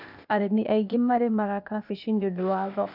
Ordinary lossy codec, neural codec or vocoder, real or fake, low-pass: none; codec, 16 kHz, 0.8 kbps, ZipCodec; fake; 5.4 kHz